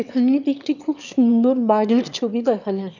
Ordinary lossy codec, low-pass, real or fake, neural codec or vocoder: none; 7.2 kHz; fake; autoencoder, 22.05 kHz, a latent of 192 numbers a frame, VITS, trained on one speaker